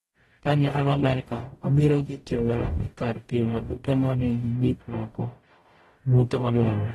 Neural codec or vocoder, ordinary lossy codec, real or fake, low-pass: codec, 44.1 kHz, 0.9 kbps, DAC; AAC, 32 kbps; fake; 19.8 kHz